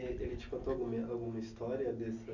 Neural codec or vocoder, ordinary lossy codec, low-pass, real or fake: none; none; 7.2 kHz; real